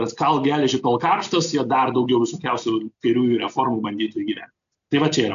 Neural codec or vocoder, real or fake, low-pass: none; real; 7.2 kHz